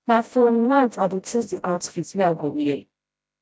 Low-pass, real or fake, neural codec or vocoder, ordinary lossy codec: none; fake; codec, 16 kHz, 0.5 kbps, FreqCodec, smaller model; none